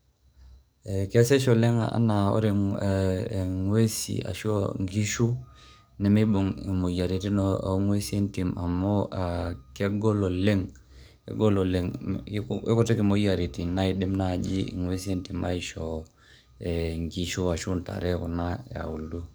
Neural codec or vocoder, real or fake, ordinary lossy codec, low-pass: codec, 44.1 kHz, 7.8 kbps, DAC; fake; none; none